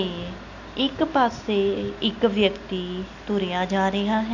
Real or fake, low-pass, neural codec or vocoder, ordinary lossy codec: real; 7.2 kHz; none; none